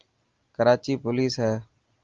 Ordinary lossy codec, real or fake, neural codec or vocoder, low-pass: Opus, 32 kbps; real; none; 7.2 kHz